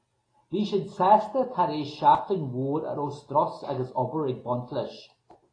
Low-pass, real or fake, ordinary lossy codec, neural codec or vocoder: 9.9 kHz; real; AAC, 32 kbps; none